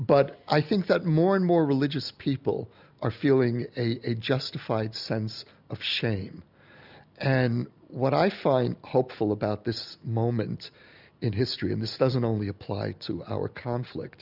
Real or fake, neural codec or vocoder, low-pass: real; none; 5.4 kHz